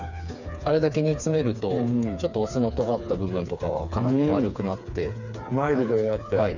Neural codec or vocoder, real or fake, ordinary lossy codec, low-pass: codec, 16 kHz, 4 kbps, FreqCodec, smaller model; fake; none; 7.2 kHz